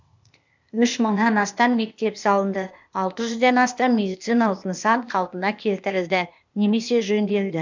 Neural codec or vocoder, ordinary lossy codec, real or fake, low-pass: codec, 16 kHz, 0.8 kbps, ZipCodec; MP3, 64 kbps; fake; 7.2 kHz